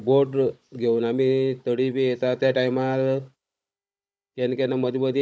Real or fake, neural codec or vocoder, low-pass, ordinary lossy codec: fake; codec, 16 kHz, 16 kbps, FunCodec, trained on Chinese and English, 50 frames a second; none; none